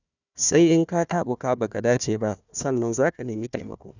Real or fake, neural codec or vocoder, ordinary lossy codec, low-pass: fake; codec, 16 kHz, 1 kbps, FunCodec, trained on Chinese and English, 50 frames a second; none; 7.2 kHz